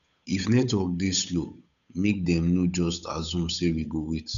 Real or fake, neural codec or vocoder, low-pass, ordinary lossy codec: fake; codec, 16 kHz, 8 kbps, FunCodec, trained on Chinese and English, 25 frames a second; 7.2 kHz; none